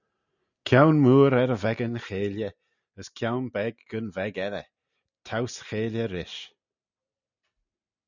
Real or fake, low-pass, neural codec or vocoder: real; 7.2 kHz; none